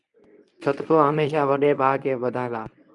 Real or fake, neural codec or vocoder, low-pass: fake; codec, 24 kHz, 0.9 kbps, WavTokenizer, medium speech release version 1; 10.8 kHz